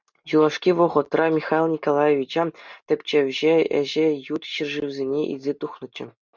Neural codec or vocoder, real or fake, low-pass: none; real; 7.2 kHz